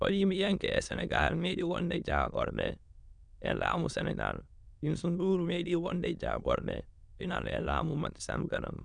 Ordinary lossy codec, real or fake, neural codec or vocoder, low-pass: none; fake; autoencoder, 22.05 kHz, a latent of 192 numbers a frame, VITS, trained on many speakers; 9.9 kHz